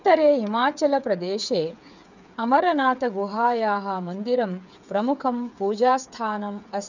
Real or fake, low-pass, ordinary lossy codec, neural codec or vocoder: fake; 7.2 kHz; none; codec, 16 kHz, 8 kbps, FreqCodec, smaller model